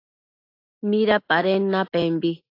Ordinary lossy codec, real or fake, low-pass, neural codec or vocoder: AAC, 32 kbps; real; 5.4 kHz; none